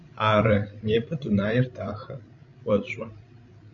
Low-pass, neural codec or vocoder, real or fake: 7.2 kHz; codec, 16 kHz, 16 kbps, FreqCodec, larger model; fake